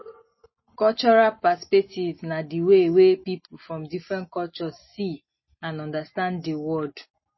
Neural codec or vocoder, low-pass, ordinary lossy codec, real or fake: none; 7.2 kHz; MP3, 24 kbps; real